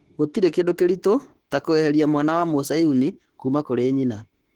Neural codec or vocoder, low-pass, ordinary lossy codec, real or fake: autoencoder, 48 kHz, 32 numbers a frame, DAC-VAE, trained on Japanese speech; 19.8 kHz; Opus, 16 kbps; fake